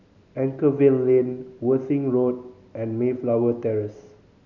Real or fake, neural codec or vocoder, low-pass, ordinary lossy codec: real; none; 7.2 kHz; none